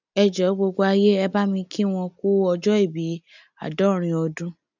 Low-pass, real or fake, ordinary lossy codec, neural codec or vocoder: 7.2 kHz; real; none; none